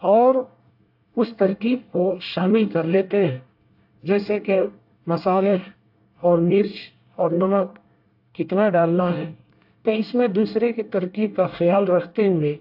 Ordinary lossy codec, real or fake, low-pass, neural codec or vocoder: AAC, 48 kbps; fake; 5.4 kHz; codec, 24 kHz, 1 kbps, SNAC